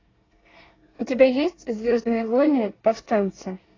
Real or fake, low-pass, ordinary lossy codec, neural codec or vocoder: fake; 7.2 kHz; AAC, 32 kbps; codec, 24 kHz, 1 kbps, SNAC